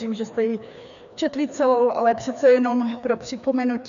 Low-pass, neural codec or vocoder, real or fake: 7.2 kHz; codec, 16 kHz, 2 kbps, FreqCodec, larger model; fake